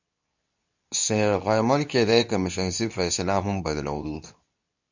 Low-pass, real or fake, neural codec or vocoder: 7.2 kHz; fake; codec, 24 kHz, 0.9 kbps, WavTokenizer, medium speech release version 2